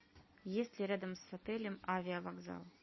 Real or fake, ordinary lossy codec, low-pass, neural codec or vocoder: real; MP3, 24 kbps; 7.2 kHz; none